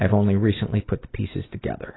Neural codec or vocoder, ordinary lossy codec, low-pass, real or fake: none; AAC, 16 kbps; 7.2 kHz; real